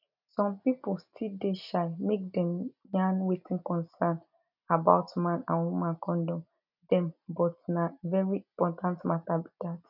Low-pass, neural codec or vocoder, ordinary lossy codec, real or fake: 5.4 kHz; none; none; real